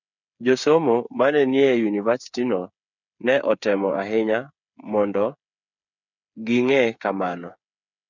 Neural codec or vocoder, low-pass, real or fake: codec, 16 kHz, 8 kbps, FreqCodec, smaller model; 7.2 kHz; fake